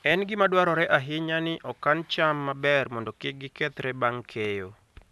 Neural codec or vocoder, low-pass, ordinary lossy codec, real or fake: none; none; none; real